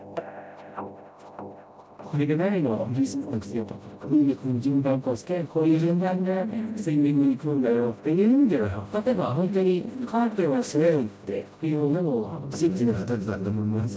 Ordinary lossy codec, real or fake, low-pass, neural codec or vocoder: none; fake; none; codec, 16 kHz, 0.5 kbps, FreqCodec, smaller model